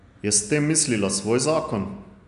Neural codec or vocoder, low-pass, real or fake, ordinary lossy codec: none; 10.8 kHz; real; none